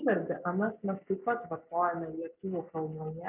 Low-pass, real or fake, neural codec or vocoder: 3.6 kHz; real; none